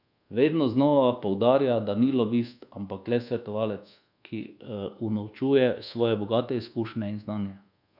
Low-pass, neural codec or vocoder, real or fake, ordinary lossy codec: 5.4 kHz; codec, 24 kHz, 1.2 kbps, DualCodec; fake; none